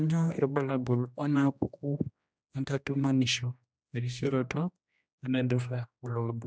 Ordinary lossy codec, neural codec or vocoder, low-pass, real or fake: none; codec, 16 kHz, 1 kbps, X-Codec, HuBERT features, trained on general audio; none; fake